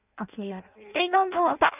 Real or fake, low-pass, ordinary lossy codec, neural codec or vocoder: fake; 3.6 kHz; none; codec, 16 kHz in and 24 kHz out, 0.6 kbps, FireRedTTS-2 codec